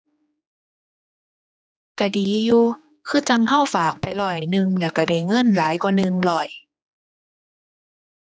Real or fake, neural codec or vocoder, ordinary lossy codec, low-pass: fake; codec, 16 kHz, 2 kbps, X-Codec, HuBERT features, trained on general audio; none; none